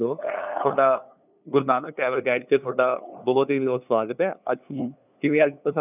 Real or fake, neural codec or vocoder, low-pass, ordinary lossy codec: fake; codec, 16 kHz, 2 kbps, FunCodec, trained on LibriTTS, 25 frames a second; 3.6 kHz; none